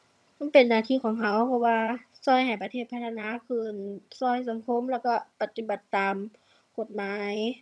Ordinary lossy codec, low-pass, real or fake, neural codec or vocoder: none; none; fake; vocoder, 22.05 kHz, 80 mel bands, HiFi-GAN